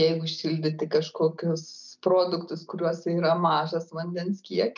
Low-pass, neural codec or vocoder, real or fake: 7.2 kHz; none; real